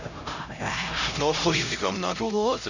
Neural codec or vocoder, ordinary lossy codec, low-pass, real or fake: codec, 16 kHz, 0.5 kbps, X-Codec, HuBERT features, trained on LibriSpeech; none; 7.2 kHz; fake